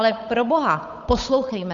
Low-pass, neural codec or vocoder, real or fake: 7.2 kHz; codec, 16 kHz, 8 kbps, FunCodec, trained on Chinese and English, 25 frames a second; fake